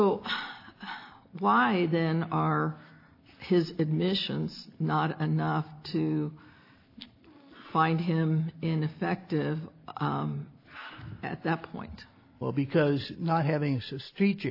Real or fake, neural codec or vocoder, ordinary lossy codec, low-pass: real; none; AAC, 32 kbps; 5.4 kHz